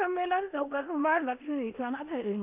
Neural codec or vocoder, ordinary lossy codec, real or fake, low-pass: codec, 16 kHz in and 24 kHz out, 0.9 kbps, LongCat-Audio-Codec, four codebook decoder; AAC, 32 kbps; fake; 3.6 kHz